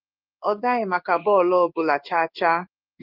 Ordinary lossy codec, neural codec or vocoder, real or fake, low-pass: Opus, 32 kbps; codec, 16 kHz in and 24 kHz out, 1 kbps, XY-Tokenizer; fake; 5.4 kHz